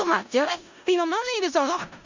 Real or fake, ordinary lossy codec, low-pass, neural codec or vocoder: fake; Opus, 64 kbps; 7.2 kHz; codec, 16 kHz in and 24 kHz out, 0.4 kbps, LongCat-Audio-Codec, four codebook decoder